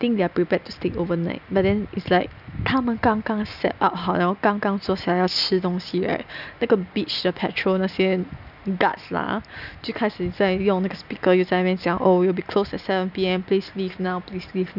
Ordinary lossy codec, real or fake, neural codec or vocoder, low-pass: none; real; none; 5.4 kHz